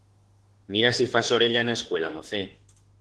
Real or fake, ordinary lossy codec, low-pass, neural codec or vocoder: fake; Opus, 16 kbps; 10.8 kHz; autoencoder, 48 kHz, 32 numbers a frame, DAC-VAE, trained on Japanese speech